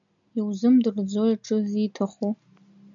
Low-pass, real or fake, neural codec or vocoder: 7.2 kHz; real; none